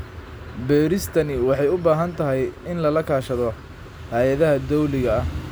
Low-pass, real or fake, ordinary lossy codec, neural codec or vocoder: none; real; none; none